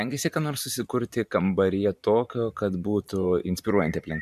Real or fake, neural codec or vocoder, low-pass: fake; vocoder, 44.1 kHz, 128 mel bands every 512 samples, BigVGAN v2; 14.4 kHz